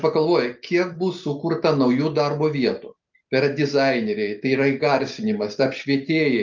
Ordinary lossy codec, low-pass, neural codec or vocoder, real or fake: Opus, 24 kbps; 7.2 kHz; none; real